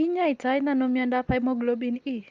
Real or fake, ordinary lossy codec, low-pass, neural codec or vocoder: real; Opus, 32 kbps; 7.2 kHz; none